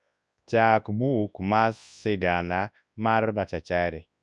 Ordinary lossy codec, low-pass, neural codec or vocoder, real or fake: none; 10.8 kHz; codec, 24 kHz, 0.9 kbps, WavTokenizer, large speech release; fake